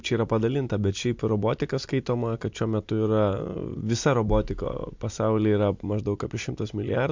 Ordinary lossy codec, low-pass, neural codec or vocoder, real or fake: MP3, 64 kbps; 7.2 kHz; none; real